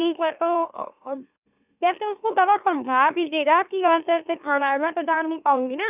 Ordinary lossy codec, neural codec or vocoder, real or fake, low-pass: none; autoencoder, 44.1 kHz, a latent of 192 numbers a frame, MeloTTS; fake; 3.6 kHz